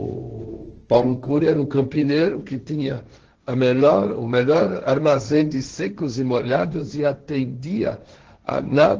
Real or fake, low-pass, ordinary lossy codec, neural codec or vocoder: fake; 7.2 kHz; Opus, 16 kbps; codec, 16 kHz, 1.1 kbps, Voila-Tokenizer